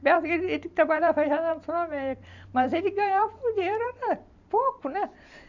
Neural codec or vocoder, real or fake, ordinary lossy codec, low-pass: vocoder, 44.1 kHz, 128 mel bands every 512 samples, BigVGAN v2; fake; none; 7.2 kHz